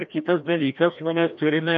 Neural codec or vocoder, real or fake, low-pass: codec, 16 kHz, 1 kbps, FreqCodec, larger model; fake; 7.2 kHz